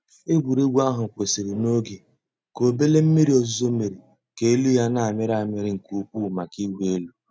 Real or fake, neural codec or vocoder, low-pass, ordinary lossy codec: real; none; none; none